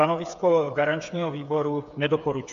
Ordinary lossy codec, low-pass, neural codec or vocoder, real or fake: AAC, 96 kbps; 7.2 kHz; codec, 16 kHz, 8 kbps, FreqCodec, smaller model; fake